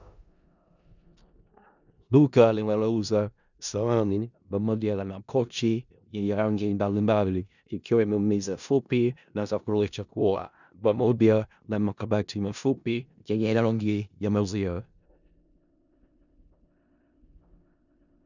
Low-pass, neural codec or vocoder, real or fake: 7.2 kHz; codec, 16 kHz in and 24 kHz out, 0.4 kbps, LongCat-Audio-Codec, four codebook decoder; fake